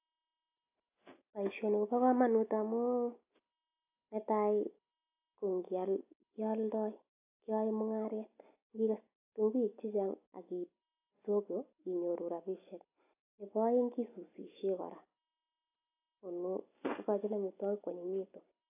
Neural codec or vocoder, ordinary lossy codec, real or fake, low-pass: none; AAC, 32 kbps; real; 3.6 kHz